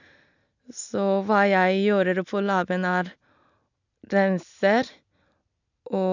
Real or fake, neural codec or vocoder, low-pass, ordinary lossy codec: real; none; 7.2 kHz; none